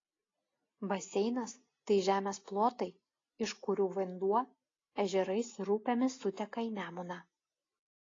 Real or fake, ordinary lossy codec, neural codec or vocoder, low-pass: real; AAC, 32 kbps; none; 7.2 kHz